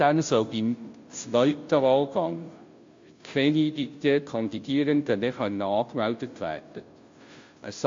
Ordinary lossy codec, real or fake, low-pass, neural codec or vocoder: MP3, 48 kbps; fake; 7.2 kHz; codec, 16 kHz, 0.5 kbps, FunCodec, trained on Chinese and English, 25 frames a second